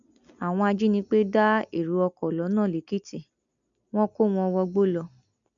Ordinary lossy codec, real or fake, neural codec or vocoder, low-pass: none; real; none; 7.2 kHz